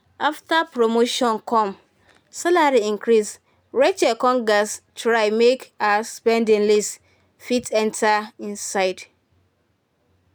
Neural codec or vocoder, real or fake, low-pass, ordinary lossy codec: none; real; none; none